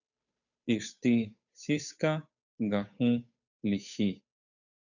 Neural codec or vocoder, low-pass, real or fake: codec, 16 kHz, 8 kbps, FunCodec, trained on Chinese and English, 25 frames a second; 7.2 kHz; fake